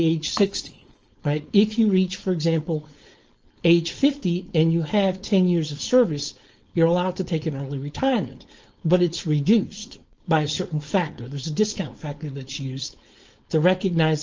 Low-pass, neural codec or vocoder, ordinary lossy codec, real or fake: 7.2 kHz; codec, 16 kHz, 4.8 kbps, FACodec; Opus, 24 kbps; fake